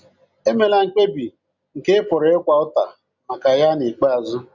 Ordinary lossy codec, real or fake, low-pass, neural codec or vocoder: none; real; 7.2 kHz; none